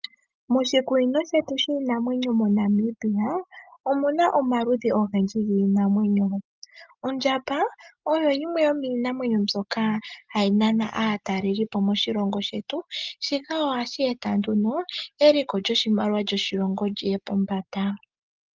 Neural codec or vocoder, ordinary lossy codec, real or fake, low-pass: none; Opus, 32 kbps; real; 7.2 kHz